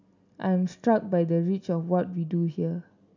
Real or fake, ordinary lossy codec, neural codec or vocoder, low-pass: real; none; none; 7.2 kHz